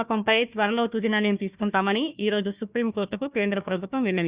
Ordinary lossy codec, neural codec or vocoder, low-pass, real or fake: Opus, 64 kbps; codec, 16 kHz, 1 kbps, FunCodec, trained on Chinese and English, 50 frames a second; 3.6 kHz; fake